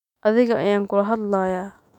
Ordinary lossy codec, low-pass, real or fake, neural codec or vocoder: none; 19.8 kHz; fake; autoencoder, 48 kHz, 128 numbers a frame, DAC-VAE, trained on Japanese speech